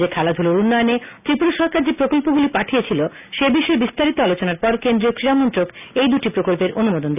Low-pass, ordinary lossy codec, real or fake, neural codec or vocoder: 3.6 kHz; none; real; none